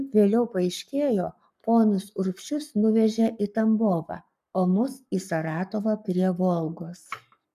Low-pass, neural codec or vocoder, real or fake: 14.4 kHz; codec, 44.1 kHz, 7.8 kbps, Pupu-Codec; fake